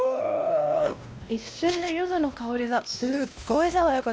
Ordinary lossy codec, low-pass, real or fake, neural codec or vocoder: none; none; fake; codec, 16 kHz, 1 kbps, X-Codec, WavLM features, trained on Multilingual LibriSpeech